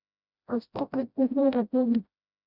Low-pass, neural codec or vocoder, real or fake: 5.4 kHz; codec, 16 kHz, 0.5 kbps, FreqCodec, smaller model; fake